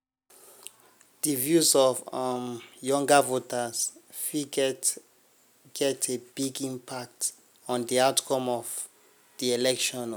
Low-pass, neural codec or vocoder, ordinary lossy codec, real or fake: none; none; none; real